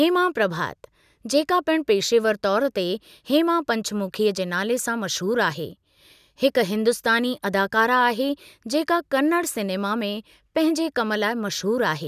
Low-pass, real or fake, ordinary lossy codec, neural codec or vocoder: 14.4 kHz; real; none; none